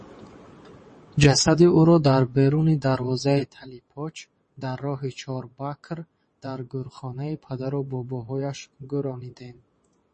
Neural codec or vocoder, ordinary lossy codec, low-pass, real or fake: vocoder, 22.05 kHz, 80 mel bands, WaveNeXt; MP3, 32 kbps; 9.9 kHz; fake